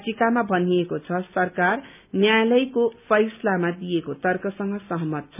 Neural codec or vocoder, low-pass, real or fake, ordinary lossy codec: none; 3.6 kHz; real; none